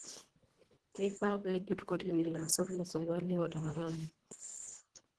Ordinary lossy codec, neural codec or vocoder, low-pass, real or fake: Opus, 16 kbps; codec, 24 kHz, 1.5 kbps, HILCodec; 10.8 kHz; fake